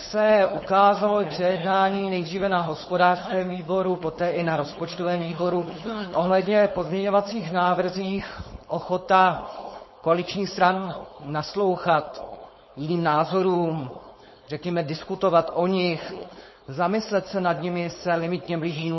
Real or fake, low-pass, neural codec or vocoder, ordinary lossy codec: fake; 7.2 kHz; codec, 16 kHz, 4.8 kbps, FACodec; MP3, 24 kbps